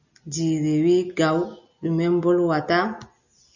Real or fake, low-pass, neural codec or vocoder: real; 7.2 kHz; none